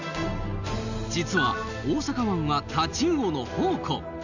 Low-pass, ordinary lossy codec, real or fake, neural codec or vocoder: 7.2 kHz; none; real; none